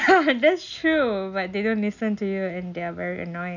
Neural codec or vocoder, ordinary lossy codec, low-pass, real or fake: none; none; 7.2 kHz; real